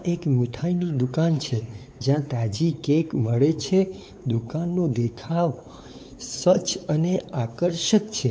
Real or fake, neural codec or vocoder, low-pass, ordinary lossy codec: fake; codec, 16 kHz, 4 kbps, X-Codec, WavLM features, trained on Multilingual LibriSpeech; none; none